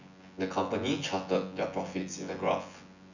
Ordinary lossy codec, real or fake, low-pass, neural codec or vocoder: none; fake; 7.2 kHz; vocoder, 24 kHz, 100 mel bands, Vocos